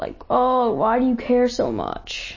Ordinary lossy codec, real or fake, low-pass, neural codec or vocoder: MP3, 32 kbps; real; 7.2 kHz; none